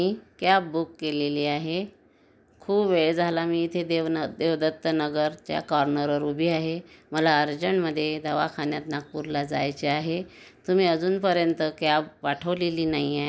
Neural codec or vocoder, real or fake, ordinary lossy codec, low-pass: none; real; none; none